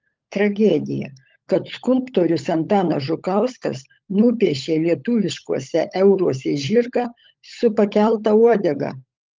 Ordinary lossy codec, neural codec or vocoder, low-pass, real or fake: Opus, 32 kbps; codec, 16 kHz, 16 kbps, FunCodec, trained on LibriTTS, 50 frames a second; 7.2 kHz; fake